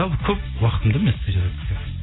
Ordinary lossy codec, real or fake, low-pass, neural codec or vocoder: AAC, 16 kbps; real; 7.2 kHz; none